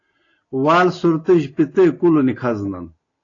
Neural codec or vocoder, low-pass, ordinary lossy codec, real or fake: none; 7.2 kHz; AAC, 32 kbps; real